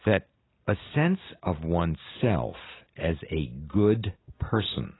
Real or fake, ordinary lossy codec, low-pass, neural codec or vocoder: real; AAC, 16 kbps; 7.2 kHz; none